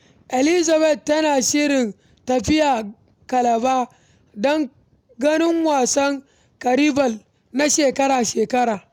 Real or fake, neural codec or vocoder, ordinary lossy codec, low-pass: real; none; none; 19.8 kHz